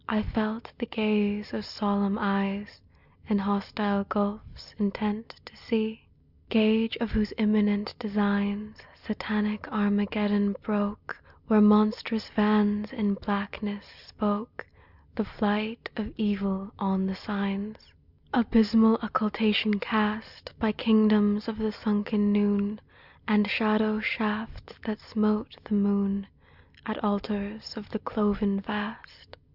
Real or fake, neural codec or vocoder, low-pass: real; none; 5.4 kHz